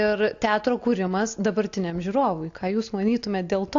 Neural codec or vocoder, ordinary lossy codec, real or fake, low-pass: none; AAC, 48 kbps; real; 7.2 kHz